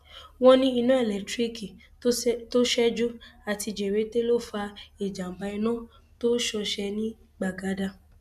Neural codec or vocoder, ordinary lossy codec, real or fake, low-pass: none; none; real; 14.4 kHz